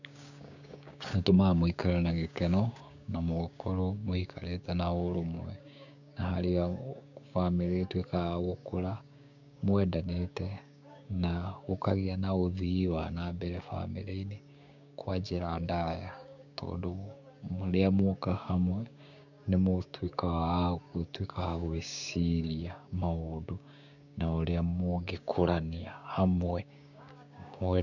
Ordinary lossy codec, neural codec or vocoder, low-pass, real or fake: none; codec, 16 kHz, 6 kbps, DAC; 7.2 kHz; fake